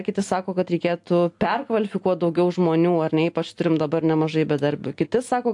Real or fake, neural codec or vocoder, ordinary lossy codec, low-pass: real; none; AAC, 64 kbps; 10.8 kHz